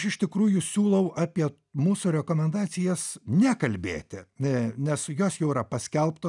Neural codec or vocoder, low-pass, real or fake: none; 10.8 kHz; real